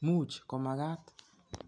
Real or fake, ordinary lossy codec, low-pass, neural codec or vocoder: real; none; 9.9 kHz; none